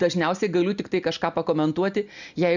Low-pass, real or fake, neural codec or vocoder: 7.2 kHz; real; none